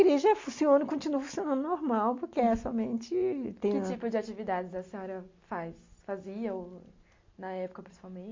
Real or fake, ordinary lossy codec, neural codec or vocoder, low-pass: real; MP3, 48 kbps; none; 7.2 kHz